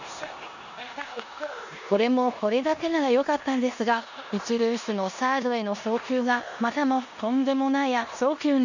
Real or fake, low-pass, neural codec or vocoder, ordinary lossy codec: fake; 7.2 kHz; codec, 16 kHz in and 24 kHz out, 0.9 kbps, LongCat-Audio-Codec, four codebook decoder; none